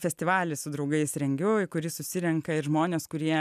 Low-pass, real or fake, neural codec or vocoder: 14.4 kHz; real; none